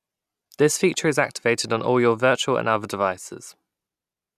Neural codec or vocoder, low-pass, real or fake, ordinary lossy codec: none; 14.4 kHz; real; none